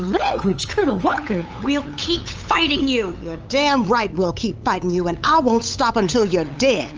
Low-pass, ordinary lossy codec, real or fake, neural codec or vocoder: 7.2 kHz; Opus, 24 kbps; fake; codec, 16 kHz, 8 kbps, FunCodec, trained on LibriTTS, 25 frames a second